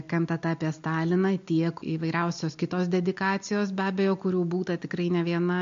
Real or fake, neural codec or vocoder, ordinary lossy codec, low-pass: real; none; MP3, 48 kbps; 7.2 kHz